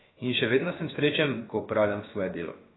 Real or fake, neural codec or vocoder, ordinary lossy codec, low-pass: fake; codec, 16 kHz, about 1 kbps, DyCAST, with the encoder's durations; AAC, 16 kbps; 7.2 kHz